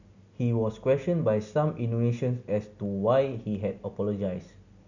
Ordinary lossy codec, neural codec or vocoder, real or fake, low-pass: none; none; real; 7.2 kHz